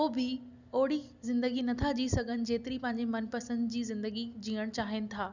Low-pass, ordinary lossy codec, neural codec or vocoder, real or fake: 7.2 kHz; none; none; real